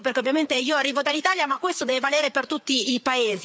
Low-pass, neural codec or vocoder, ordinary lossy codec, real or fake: none; codec, 16 kHz, 4 kbps, FreqCodec, larger model; none; fake